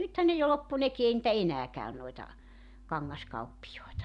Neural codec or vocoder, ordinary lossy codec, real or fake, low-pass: vocoder, 44.1 kHz, 128 mel bands every 512 samples, BigVGAN v2; none; fake; 10.8 kHz